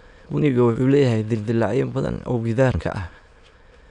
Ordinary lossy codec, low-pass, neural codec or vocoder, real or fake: none; 9.9 kHz; autoencoder, 22.05 kHz, a latent of 192 numbers a frame, VITS, trained on many speakers; fake